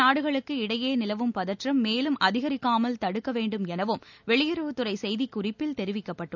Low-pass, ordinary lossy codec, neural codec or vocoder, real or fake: 7.2 kHz; none; none; real